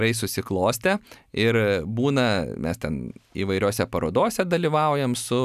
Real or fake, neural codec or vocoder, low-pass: real; none; 14.4 kHz